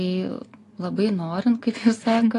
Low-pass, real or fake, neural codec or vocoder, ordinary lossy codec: 10.8 kHz; real; none; AAC, 48 kbps